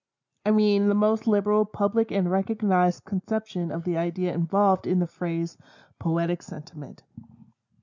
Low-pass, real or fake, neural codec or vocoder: 7.2 kHz; real; none